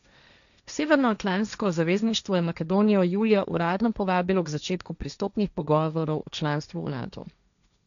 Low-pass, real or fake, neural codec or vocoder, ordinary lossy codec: 7.2 kHz; fake; codec, 16 kHz, 1.1 kbps, Voila-Tokenizer; none